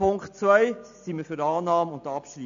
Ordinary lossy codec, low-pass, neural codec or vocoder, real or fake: AAC, 64 kbps; 7.2 kHz; none; real